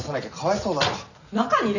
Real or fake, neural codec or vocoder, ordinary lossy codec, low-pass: real; none; none; 7.2 kHz